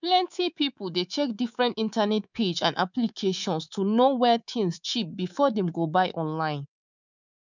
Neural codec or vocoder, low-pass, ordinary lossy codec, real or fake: codec, 24 kHz, 3.1 kbps, DualCodec; 7.2 kHz; none; fake